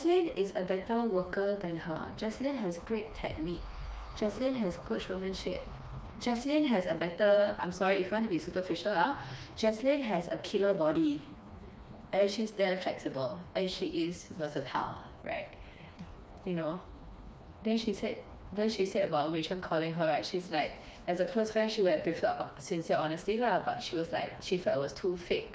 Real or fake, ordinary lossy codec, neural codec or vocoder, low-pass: fake; none; codec, 16 kHz, 2 kbps, FreqCodec, smaller model; none